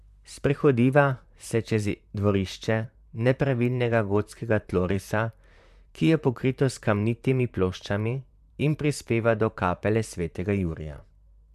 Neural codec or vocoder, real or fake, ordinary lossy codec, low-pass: vocoder, 44.1 kHz, 128 mel bands, Pupu-Vocoder; fake; MP3, 96 kbps; 14.4 kHz